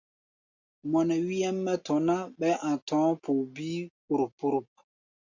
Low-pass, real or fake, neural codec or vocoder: 7.2 kHz; real; none